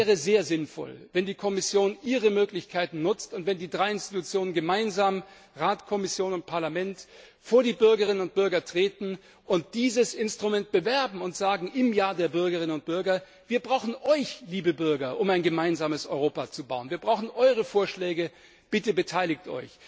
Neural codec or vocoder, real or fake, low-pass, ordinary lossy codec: none; real; none; none